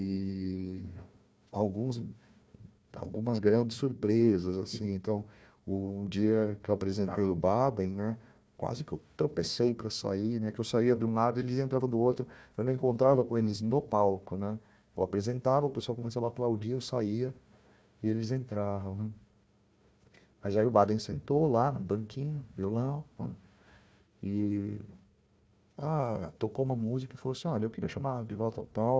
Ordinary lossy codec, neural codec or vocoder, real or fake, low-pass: none; codec, 16 kHz, 1 kbps, FunCodec, trained on Chinese and English, 50 frames a second; fake; none